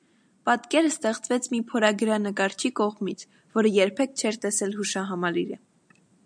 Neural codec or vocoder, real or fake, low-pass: none; real; 9.9 kHz